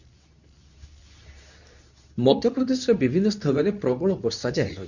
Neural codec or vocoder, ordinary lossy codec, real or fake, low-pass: codec, 24 kHz, 0.9 kbps, WavTokenizer, medium speech release version 2; none; fake; 7.2 kHz